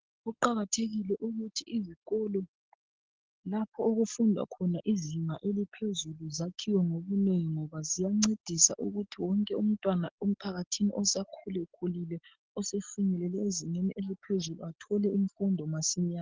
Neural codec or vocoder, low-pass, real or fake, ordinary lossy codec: none; 7.2 kHz; real; Opus, 16 kbps